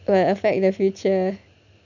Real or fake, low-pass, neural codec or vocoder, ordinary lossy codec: real; 7.2 kHz; none; none